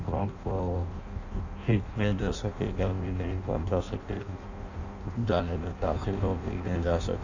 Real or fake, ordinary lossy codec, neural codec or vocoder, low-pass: fake; none; codec, 16 kHz in and 24 kHz out, 0.6 kbps, FireRedTTS-2 codec; 7.2 kHz